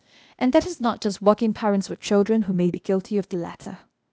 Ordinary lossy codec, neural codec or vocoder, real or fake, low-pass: none; codec, 16 kHz, 0.8 kbps, ZipCodec; fake; none